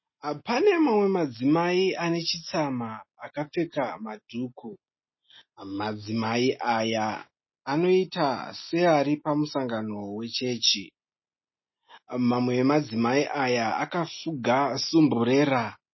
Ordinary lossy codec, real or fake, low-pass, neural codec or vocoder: MP3, 24 kbps; real; 7.2 kHz; none